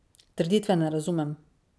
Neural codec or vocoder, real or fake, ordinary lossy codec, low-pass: none; real; none; none